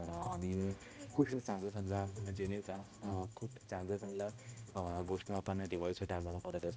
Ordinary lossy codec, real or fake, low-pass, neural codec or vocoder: none; fake; none; codec, 16 kHz, 1 kbps, X-Codec, HuBERT features, trained on balanced general audio